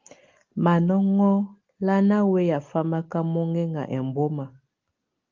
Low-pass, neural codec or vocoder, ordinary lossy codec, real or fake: 7.2 kHz; none; Opus, 32 kbps; real